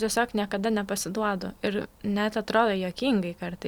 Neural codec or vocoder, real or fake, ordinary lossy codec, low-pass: vocoder, 44.1 kHz, 128 mel bands every 256 samples, BigVGAN v2; fake; Opus, 64 kbps; 19.8 kHz